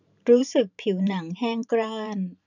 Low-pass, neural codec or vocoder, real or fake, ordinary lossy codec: 7.2 kHz; vocoder, 44.1 kHz, 128 mel bands every 512 samples, BigVGAN v2; fake; none